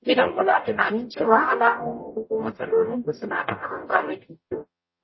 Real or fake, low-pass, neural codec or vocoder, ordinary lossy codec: fake; 7.2 kHz; codec, 44.1 kHz, 0.9 kbps, DAC; MP3, 24 kbps